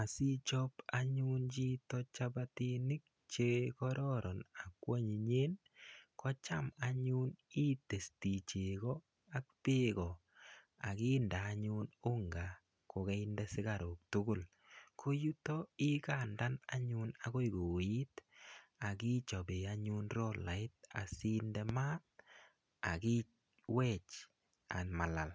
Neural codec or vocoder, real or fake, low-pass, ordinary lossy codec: none; real; none; none